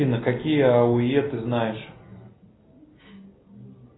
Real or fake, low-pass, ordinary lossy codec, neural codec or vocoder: real; 7.2 kHz; AAC, 16 kbps; none